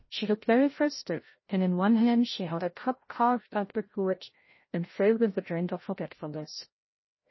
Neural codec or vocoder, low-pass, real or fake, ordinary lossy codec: codec, 16 kHz, 0.5 kbps, FreqCodec, larger model; 7.2 kHz; fake; MP3, 24 kbps